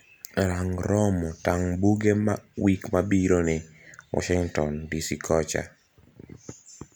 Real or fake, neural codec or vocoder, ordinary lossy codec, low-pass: real; none; none; none